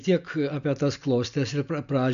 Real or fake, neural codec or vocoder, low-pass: real; none; 7.2 kHz